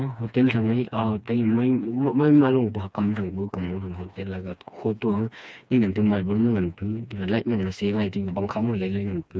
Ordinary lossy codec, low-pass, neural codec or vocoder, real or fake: none; none; codec, 16 kHz, 2 kbps, FreqCodec, smaller model; fake